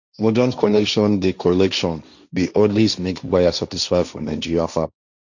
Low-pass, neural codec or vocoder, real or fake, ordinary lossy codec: 7.2 kHz; codec, 16 kHz, 1.1 kbps, Voila-Tokenizer; fake; none